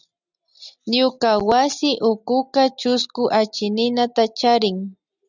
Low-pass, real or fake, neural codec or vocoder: 7.2 kHz; real; none